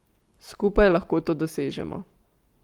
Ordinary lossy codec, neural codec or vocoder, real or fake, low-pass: Opus, 32 kbps; codec, 44.1 kHz, 7.8 kbps, Pupu-Codec; fake; 19.8 kHz